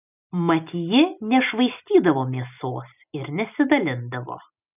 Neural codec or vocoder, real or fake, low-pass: none; real; 3.6 kHz